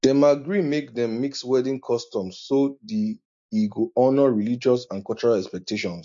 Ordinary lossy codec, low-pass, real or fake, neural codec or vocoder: MP3, 48 kbps; 7.2 kHz; real; none